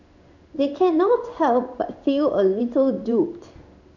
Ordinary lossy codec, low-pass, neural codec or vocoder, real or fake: none; 7.2 kHz; codec, 16 kHz in and 24 kHz out, 1 kbps, XY-Tokenizer; fake